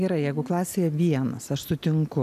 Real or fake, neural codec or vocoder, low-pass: real; none; 14.4 kHz